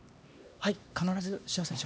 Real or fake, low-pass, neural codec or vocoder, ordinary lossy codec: fake; none; codec, 16 kHz, 2 kbps, X-Codec, HuBERT features, trained on LibriSpeech; none